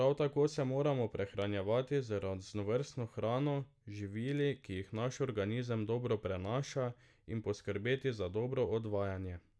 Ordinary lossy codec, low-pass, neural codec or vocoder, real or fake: none; none; none; real